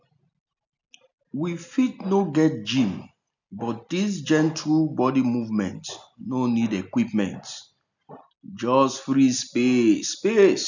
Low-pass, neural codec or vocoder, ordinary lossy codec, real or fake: 7.2 kHz; none; none; real